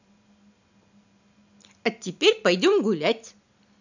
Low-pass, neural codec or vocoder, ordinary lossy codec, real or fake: 7.2 kHz; none; none; real